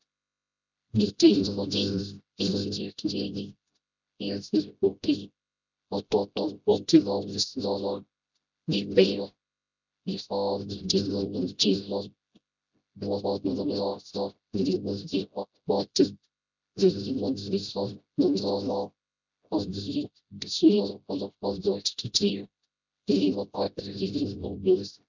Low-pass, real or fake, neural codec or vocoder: 7.2 kHz; fake; codec, 16 kHz, 0.5 kbps, FreqCodec, smaller model